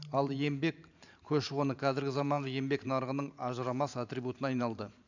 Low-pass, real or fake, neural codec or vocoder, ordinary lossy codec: 7.2 kHz; fake; vocoder, 44.1 kHz, 128 mel bands every 512 samples, BigVGAN v2; none